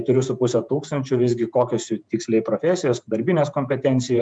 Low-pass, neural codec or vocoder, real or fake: 9.9 kHz; vocoder, 48 kHz, 128 mel bands, Vocos; fake